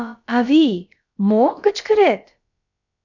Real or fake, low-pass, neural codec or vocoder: fake; 7.2 kHz; codec, 16 kHz, about 1 kbps, DyCAST, with the encoder's durations